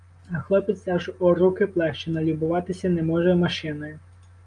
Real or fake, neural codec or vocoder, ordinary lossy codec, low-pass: real; none; Opus, 32 kbps; 9.9 kHz